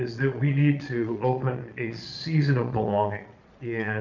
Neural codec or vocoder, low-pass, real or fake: vocoder, 22.05 kHz, 80 mel bands, Vocos; 7.2 kHz; fake